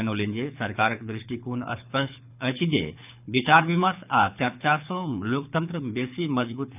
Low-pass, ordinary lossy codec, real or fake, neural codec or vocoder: 3.6 kHz; none; fake; codec, 24 kHz, 6 kbps, HILCodec